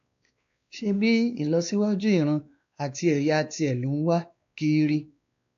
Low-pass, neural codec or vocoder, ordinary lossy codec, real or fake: 7.2 kHz; codec, 16 kHz, 2 kbps, X-Codec, WavLM features, trained on Multilingual LibriSpeech; AAC, 64 kbps; fake